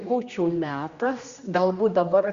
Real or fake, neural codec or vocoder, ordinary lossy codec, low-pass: fake; codec, 16 kHz, 1 kbps, X-Codec, HuBERT features, trained on general audio; Opus, 64 kbps; 7.2 kHz